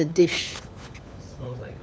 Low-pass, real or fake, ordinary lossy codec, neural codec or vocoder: none; fake; none; codec, 16 kHz, 4 kbps, FunCodec, trained on LibriTTS, 50 frames a second